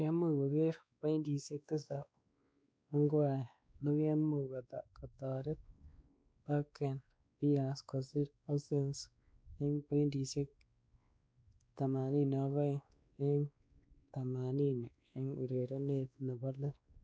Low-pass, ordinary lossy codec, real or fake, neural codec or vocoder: none; none; fake; codec, 16 kHz, 2 kbps, X-Codec, WavLM features, trained on Multilingual LibriSpeech